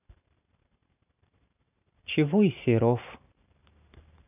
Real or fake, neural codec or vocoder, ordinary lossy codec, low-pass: fake; vocoder, 44.1 kHz, 128 mel bands every 512 samples, BigVGAN v2; none; 3.6 kHz